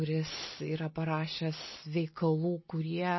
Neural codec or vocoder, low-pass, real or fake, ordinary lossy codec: none; 7.2 kHz; real; MP3, 24 kbps